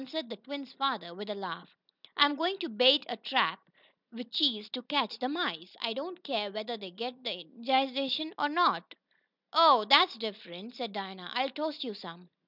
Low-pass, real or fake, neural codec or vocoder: 5.4 kHz; real; none